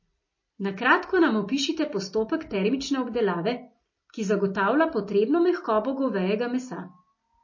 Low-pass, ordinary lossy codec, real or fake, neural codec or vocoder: 7.2 kHz; MP3, 32 kbps; real; none